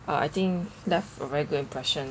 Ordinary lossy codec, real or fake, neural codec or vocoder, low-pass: none; real; none; none